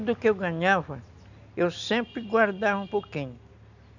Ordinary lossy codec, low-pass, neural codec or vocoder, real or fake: none; 7.2 kHz; none; real